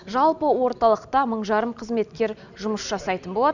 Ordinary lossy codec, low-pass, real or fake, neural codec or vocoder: none; 7.2 kHz; real; none